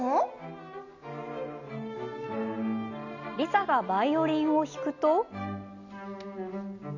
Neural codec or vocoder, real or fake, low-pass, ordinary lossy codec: none; real; 7.2 kHz; none